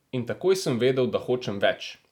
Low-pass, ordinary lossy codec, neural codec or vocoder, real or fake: 19.8 kHz; none; none; real